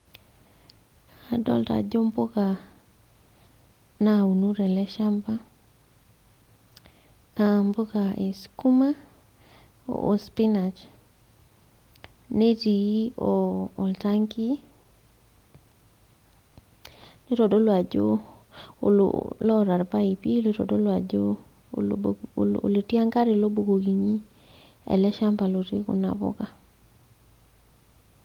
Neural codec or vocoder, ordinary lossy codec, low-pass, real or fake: none; Opus, 24 kbps; 19.8 kHz; real